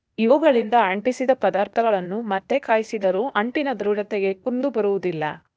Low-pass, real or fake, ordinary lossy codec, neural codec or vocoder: none; fake; none; codec, 16 kHz, 0.8 kbps, ZipCodec